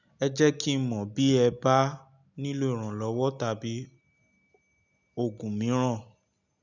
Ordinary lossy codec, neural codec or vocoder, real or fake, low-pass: none; none; real; 7.2 kHz